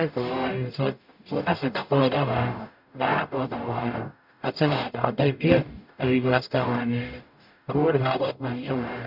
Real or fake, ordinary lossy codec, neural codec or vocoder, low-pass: fake; none; codec, 44.1 kHz, 0.9 kbps, DAC; 5.4 kHz